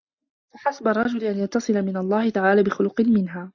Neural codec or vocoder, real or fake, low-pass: none; real; 7.2 kHz